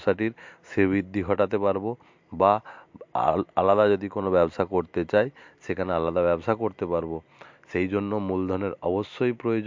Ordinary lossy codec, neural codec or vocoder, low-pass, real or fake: MP3, 48 kbps; none; 7.2 kHz; real